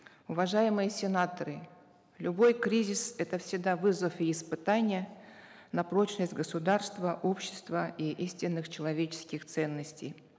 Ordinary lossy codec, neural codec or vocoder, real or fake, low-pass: none; none; real; none